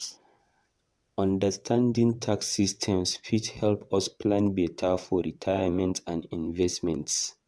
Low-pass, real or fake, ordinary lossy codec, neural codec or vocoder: none; fake; none; vocoder, 22.05 kHz, 80 mel bands, WaveNeXt